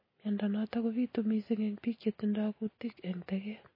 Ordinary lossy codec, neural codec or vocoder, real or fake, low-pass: MP3, 24 kbps; none; real; 5.4 kHz